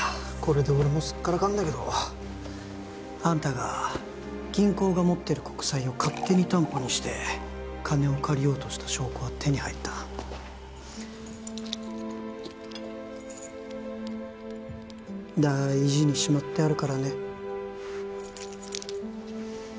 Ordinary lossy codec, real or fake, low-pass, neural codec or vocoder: none; real; none; none